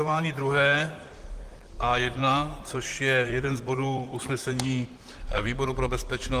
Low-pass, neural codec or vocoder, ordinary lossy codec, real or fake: 14.4 kHz; vocoder, 44.1 kHz, 128 mel bands, Pupu-Vocoder; Opus, 24 kbps; fake